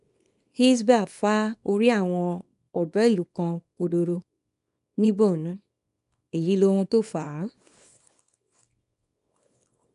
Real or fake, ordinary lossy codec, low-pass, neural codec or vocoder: fake; none; 10.8 kHz; codec, 24 kHz, 0.9 kbps, WavTokenizer, small release